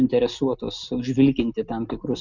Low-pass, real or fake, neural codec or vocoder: 7.2 kHz; real; none